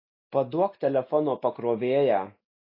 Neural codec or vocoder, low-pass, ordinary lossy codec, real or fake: none; 5.4 kHz; AAC, 32 kbps; real